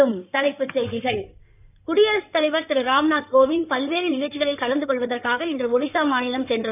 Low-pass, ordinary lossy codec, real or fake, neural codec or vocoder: 3.6 kHz; none; fake; codec, 16 kHz in and 24 kHz out, 2.2 kbps, FireRedTTS-2 codec